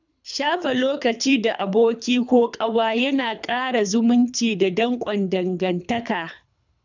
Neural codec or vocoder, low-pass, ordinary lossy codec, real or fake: codec, 24 kHz, 3 kbps, HILCodec; 7.2 kHz; none; fake